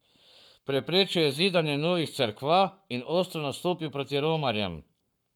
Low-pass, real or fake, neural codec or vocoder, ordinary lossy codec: 19.8 kHz; fake; codec, 44.1 kHz, 7.8 kbps, Pupu-Codec; none